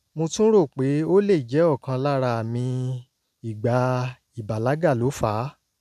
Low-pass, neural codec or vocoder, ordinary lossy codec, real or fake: 14.4 kHz; none; none; real